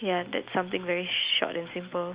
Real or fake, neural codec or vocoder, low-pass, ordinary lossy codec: real; none; 3.6 kHz; Opus, 64 kbps